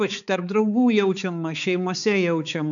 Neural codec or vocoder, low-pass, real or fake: codec, 16 kHz, 4 kbps, X-Codec, HuBERT features, trained on balanced general audio; 7.2 kHz; fake